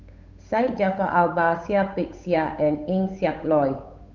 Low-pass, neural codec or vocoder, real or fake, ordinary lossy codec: 7.2 kHz; codec, 16 kHz, 8 kbps, FunCodec, trained on Chinese and English, 25 frames a second; fake; Opus, 64 kbps